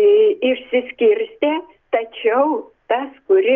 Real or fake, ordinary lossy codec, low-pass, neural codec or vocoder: real; Opus, 24 kbps; 7.2 kHz; none